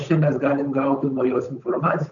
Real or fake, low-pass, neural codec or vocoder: fake; 7.2 kHz; codec, 16 kHz, 8 kbps, FunCodec, trained on Chinese and English, 25 frames a second